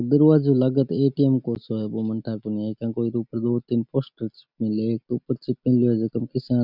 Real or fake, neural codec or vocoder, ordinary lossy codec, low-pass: real; none; none; 5.4 kHz